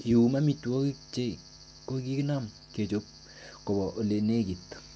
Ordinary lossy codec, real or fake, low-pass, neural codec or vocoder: none; real; none; none